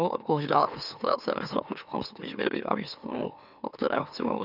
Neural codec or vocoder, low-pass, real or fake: autoencoder, 44.1 kHz, a latent of 192 numbers a frame, MeloTTS; 5.4 kHz; fake